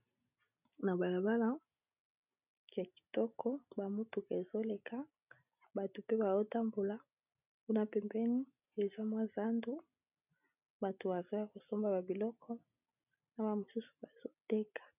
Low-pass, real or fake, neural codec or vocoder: 3.6 kHz; real; none